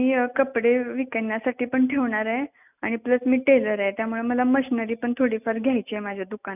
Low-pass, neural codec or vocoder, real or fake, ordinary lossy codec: 3.6 kHz; none; real; none